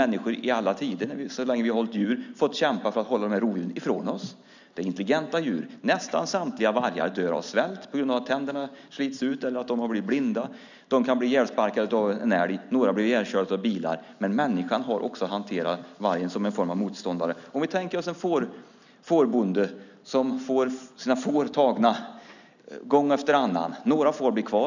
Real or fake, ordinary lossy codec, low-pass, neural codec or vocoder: real; none; 7.2 kHz; none